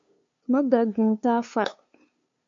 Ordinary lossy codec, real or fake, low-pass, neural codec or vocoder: MP3, 48 kbps; fake; 7.2 kHz; codec, 16 kHz, 2 kbps, FunCodec, trained on LibriTTS, 25 frames a second